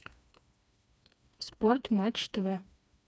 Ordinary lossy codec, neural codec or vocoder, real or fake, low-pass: none; codec, 16 kHz, 2 kbps, FreqCodec, smaller model; fake; none